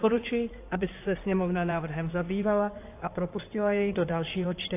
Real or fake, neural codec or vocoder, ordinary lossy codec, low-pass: fake; codec, 16 kHz in and 24 kHz out, 2.2 kbps, FireRedTTS-2 codec; AAC, 24 kbps; 3.6 kHz